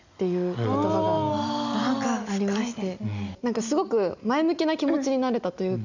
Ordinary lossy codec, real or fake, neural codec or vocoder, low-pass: none; real; none; 7.2 kHz